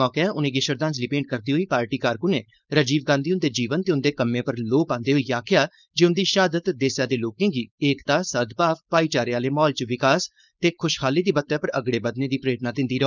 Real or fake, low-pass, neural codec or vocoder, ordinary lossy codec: fake; 7.2 kHz; codec, 16 kHz, 4.8 kbps, FACodec; none